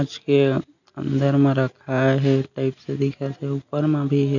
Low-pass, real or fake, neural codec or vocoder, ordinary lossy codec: 7.2 kHz; real; none; none